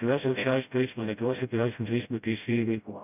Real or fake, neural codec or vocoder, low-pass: fake; codec, 16 kHz, 0.5 kbps, FreqCodec, smaller model; 3.6 kHz